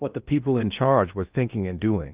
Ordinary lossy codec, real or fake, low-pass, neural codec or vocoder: Opus, 24 kbps; fake; 3.6 kHz; codec, 16 kHz in and 24 kHz out, 0.6 kbps, FocalCodec, streaming, 2048 codes